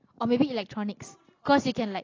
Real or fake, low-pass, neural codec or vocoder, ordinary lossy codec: real; 7.2 kHz; none; AAC, 32 kbps